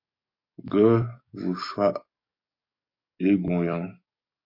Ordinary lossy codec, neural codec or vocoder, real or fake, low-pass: MP3, 32 kbps; codec, 44.1 kHz, 7.8 kbps, DAC; fake; 5.4 kHz